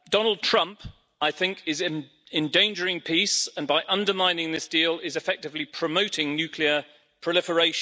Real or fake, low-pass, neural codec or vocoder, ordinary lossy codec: real; none; none; none